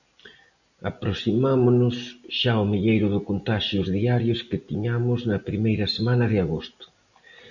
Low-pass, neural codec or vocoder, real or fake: 7.2 kHz; none; real